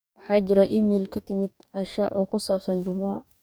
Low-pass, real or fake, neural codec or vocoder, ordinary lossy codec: none; fake; codec, 44.1 kHz, 2.6 kbps, DAC; none